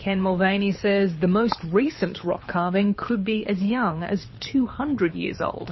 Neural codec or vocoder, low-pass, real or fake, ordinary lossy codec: codec, 24 kHz, 6 kbps, HILCodec; 7.2 kHz; fake; MP3, 24 kbps